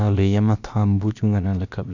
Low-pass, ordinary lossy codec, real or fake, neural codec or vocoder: 7.2 kHz; none; fake; codec, 16 kHz, about 1 kbps, DyCAST, with the encoder's durations